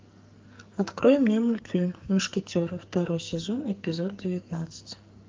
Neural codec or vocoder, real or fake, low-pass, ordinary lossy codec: codec, 44.1 kHz, 2.6 kbps, SNAC; fake; 7.2 kHz; Opus, 24 kbps